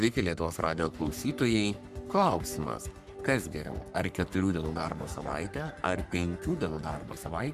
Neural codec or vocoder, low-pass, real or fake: codec, 44.1 kHz, 3.4 kbps, Pupu-Codec; 14.4 kHz; fake